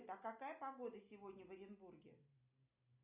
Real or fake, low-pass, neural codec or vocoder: real; 3.6 kHz; none